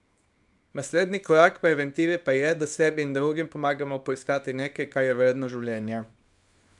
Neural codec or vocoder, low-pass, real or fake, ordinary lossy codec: codec, 24 kHz, 0.9 kbps, WavTokenizer, small release; 10.8 kHz; fake; none